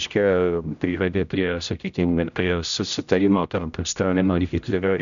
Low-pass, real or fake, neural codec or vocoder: 7.2 kHz; fake; codec, 16 kHz, 0.5 kbps, X-Codec, HuBERT features, trained on general audio